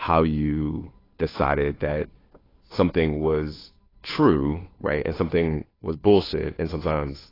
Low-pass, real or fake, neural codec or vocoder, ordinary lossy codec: 5.4 kHz; fake; codec, 16 kHz, 2 kbps, FunCodec, trained on Chinese and English, 25 frames a second; AAC, 24 kbps